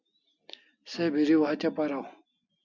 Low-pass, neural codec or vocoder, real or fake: 7.2 kHz; none; real